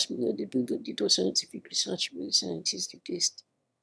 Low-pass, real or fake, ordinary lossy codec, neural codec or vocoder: none; fake; none; autoencoder, 22.05 kHz, a latent of 192 numbers a frame, VITS, trained on one speaker